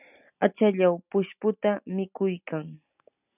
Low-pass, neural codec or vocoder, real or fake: 3.6 kHz; none; real